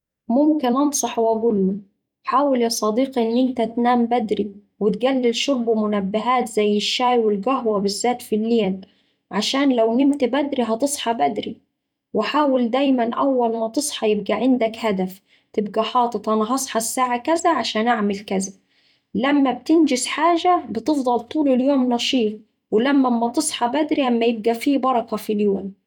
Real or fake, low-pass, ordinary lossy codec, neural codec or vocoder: fake; 19.8 kHz; none; vocoder, 44.1 kHz, 128 mel bands every 256 samples, BigVGAN v2